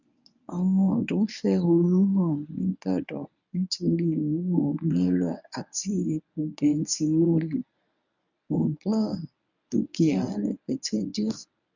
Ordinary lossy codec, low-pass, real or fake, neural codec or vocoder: none; 7.2 kHz; fake; codec, 24 kHz, 0.9 kbps, WavTokenizer, medium speech release version 1